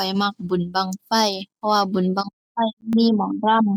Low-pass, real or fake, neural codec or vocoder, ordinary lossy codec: 19.8 kHz; real; none; none